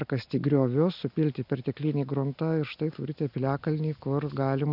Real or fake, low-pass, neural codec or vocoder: real; 5.4 kHz; none